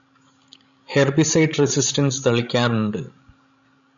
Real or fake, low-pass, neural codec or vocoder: fake; 7.2 kHz; codec, 16 kHz, 16 kbps, FreqCodec, larger model